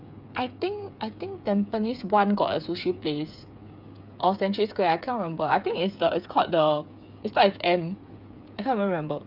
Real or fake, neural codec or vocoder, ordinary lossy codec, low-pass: fake; codec, 16 kHz, 8 kbps, FreqCodec, smaller model; none; 5.4 kHz